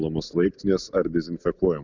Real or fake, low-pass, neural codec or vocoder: real; 7.2 kHz; none